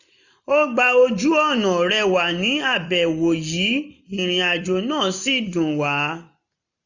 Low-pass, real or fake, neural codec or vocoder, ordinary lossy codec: 7.2 kHz; real; none; none